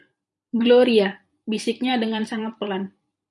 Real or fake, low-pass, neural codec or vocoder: fake; 10.8 kHz; vocoder, 44.1 kHz, 128 mel bands every 256 samples, BigVGAN v2